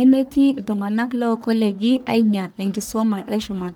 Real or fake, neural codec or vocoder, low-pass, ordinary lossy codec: fake; codec, 44.1 kHz, 1.7 kbps, Pupu-Codec; none; none